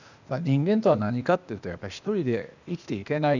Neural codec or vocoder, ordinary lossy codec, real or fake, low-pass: codec, 16 kHz, 0.8 kbps, ZipCodec; none; fake; 7.2 kHz